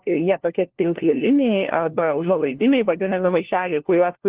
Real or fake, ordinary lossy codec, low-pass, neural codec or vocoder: fake; Opus, 16 kbps; 3.6 kHz; codec, 16 kHz, 1 kbps, FunCodec, trained on LibriTTS, 50 frames a second